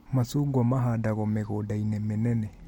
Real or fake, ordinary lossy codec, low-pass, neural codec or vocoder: real; MP3, 64 kbps; 19.8 kHz; none